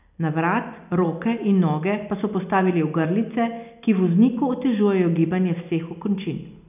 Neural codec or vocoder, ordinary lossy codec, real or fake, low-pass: none; none; real; 3.6 kHz